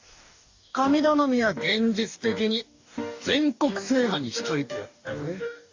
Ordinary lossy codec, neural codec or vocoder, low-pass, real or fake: AAC, 48 kbps; codec, 44.1 kHz, 2.6 kbps, DAC; 7.2 kHz; fake